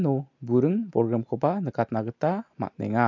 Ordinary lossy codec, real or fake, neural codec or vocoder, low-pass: MP3, 64 kbps; real; none; 7.2 kHz